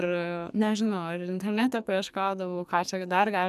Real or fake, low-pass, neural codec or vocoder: fake; 14.4 kHz; codec, 44.1 kHz, 2.6 kbps, SNAC